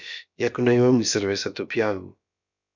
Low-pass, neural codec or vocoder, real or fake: 7.2 kHz; codec, 16 kHz, about 1 kbps, DyCAST, with the encoder's durations; fake